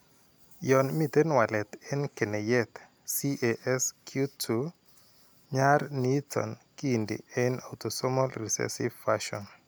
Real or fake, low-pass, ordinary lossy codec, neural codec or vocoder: real; none; none; none